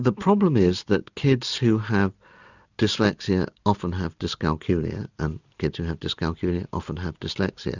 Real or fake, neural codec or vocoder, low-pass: real; none; 7.2 kHz